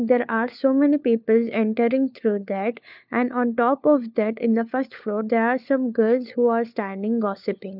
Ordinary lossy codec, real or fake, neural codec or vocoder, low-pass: none; fake; codec, 16 kHz, 4 kbps, FunCodec, trained on LibriTTS, 50 frames a second; 5.4 kHz